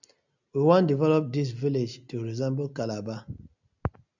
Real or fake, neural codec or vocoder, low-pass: real; none; 7.2 kHz